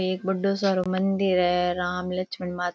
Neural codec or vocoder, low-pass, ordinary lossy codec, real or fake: none; none; none; real